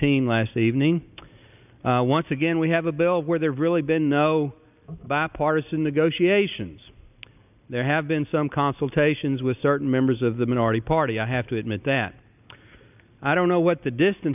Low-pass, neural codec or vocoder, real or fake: 3.6 kHz; none; real